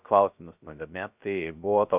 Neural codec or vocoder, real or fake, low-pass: codec, 16 kHz, 0.3 kbps, FocalCodec; fake; 3.6 kHz